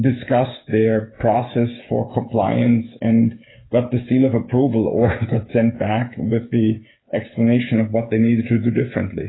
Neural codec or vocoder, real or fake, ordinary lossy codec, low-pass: codec, 16 kHz, 8 kbps, FreqCodec, smaller model; fake; AAC, 16 kbps; 7.2 kHz